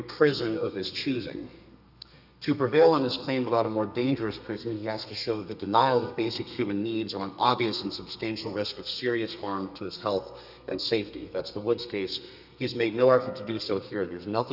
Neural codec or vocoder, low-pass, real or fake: codec, 32 kHz, 1.9 kbps, SNAC; 5.4 kHz; fake